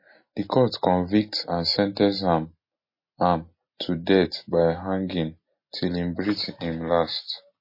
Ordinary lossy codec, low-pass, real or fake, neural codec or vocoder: MP3, 24 kbps; 5.4 kHz; real; none